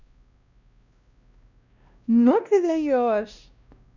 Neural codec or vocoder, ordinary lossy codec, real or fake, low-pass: codec, 16 kHz, 1 kbps, X-Codec, WavLM features, trained on Multilingual LibriSpeech; none; fake; 7.2 kHz